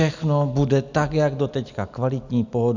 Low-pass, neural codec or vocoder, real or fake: 7.2 kHz; none; real